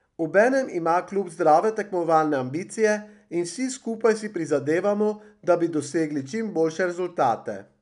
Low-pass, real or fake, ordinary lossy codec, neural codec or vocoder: 10.8 kHz; real; none; none